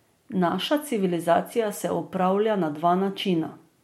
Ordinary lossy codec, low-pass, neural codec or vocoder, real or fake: MP3, 64 kbps; 19.8 kHz; none; real